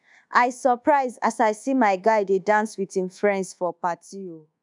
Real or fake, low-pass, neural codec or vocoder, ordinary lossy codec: fake; 10.8 kHz; codec, 24 kHz, 1.2 kbps, DualCodec; none